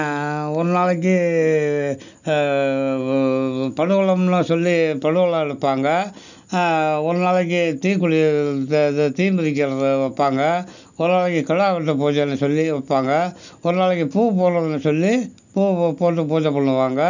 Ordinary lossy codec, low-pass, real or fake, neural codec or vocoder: none; 7.2 kHz; real; none